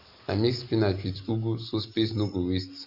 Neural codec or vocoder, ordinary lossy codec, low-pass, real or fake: none; none; 5.4 kHz; real